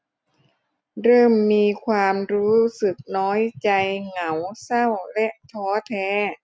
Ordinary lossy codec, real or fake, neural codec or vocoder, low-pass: none; real; none; none